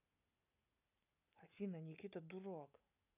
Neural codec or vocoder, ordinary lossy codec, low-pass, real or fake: none; none; 3.6 kHz; real